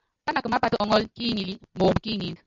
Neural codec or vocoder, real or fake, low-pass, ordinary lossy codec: none; real; 7.2 kHz; MP3, 64 kbps